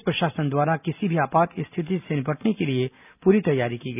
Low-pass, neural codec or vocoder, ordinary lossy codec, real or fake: 3.6 kHz; none; none; real